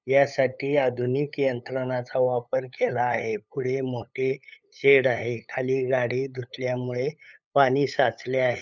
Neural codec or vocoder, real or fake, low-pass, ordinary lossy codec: codec, 16 kHz, 8 kbps, FunCodec, trained on LibriTTS, 25 frames a second; fake; 7.2 kHz; none